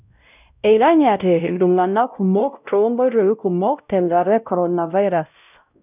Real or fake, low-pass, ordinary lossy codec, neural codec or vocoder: fake; 3.6 kHz; none; codec, 16 kHz, 0.5 kbps, X-Codec, WavLM features, trained on Multilingual LibriSpeech